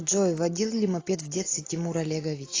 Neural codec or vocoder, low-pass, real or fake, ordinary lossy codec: none; 7.2 kHz; real; AAC, 32 kbps